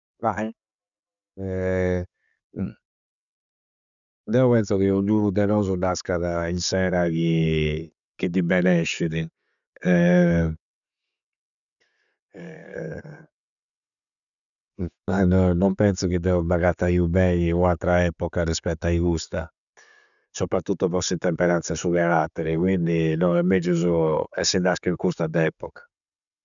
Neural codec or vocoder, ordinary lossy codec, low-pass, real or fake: none; none; 7.2 kHz; real